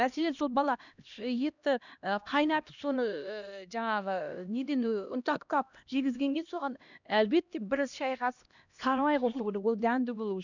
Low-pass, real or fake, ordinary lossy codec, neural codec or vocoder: 7.2 kHz; fake; none; codec, 16 kHz, 1 kbps, X-Codec, HuBERT features, trained on LibriSpeech